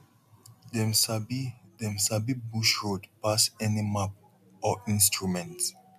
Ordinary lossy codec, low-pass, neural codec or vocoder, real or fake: none; 14.4 kHz; none; real